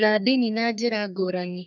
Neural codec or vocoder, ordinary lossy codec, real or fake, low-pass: codec, 32 kHz, 1.9 kbps, SNAC; none; fake; 7.2 kHz